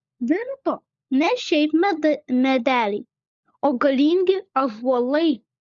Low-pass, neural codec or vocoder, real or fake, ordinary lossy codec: 7.2 kHz; codec, 16 kHz, 16 kbps, FunCodec, trained on LibriTTS, 50 frames a second; fake; Opus, 64 kbps